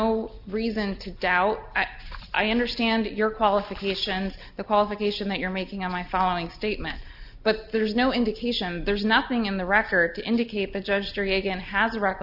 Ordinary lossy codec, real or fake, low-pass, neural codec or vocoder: Opus, 64 kbps; real; 5.4 kHz; none